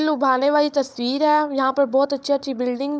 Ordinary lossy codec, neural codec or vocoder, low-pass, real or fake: none; codec, 16 kHz, 16 kbps, FunCodec, trained on Chinese and English, 50 frames a second; none; fake